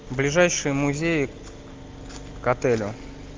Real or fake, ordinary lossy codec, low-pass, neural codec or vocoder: real; Opus, 24 kbps; 7.2 kHz; none